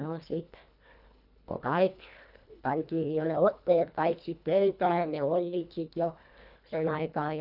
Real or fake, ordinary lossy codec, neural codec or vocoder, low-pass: fake; none; codec, 24 kHz, 1.5 kbps, HILCodec; 5.4 kHz